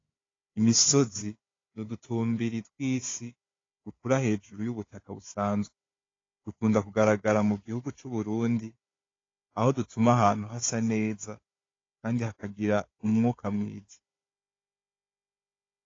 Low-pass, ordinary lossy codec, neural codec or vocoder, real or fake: 7.2 kHz; AAC, 32 kbps; codec, 16 kHz, 4 kbps, FunCodec, trained on Chinese and English, 50 frames a second; fake